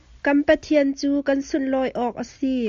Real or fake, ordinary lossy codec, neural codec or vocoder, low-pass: real; AAC, 64 kbps; none; 7.2 kHz